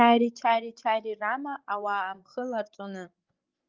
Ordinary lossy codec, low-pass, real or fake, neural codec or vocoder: Opus, 24 kbps; 7.2 kHz; fake; codec, 16 kHz, 16 kbps, FreqCodec, larger model